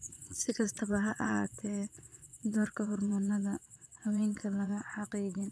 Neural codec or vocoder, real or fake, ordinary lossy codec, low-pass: vocoder, 22.05 kHz, 80 mel bands, Vocos; fake; none; none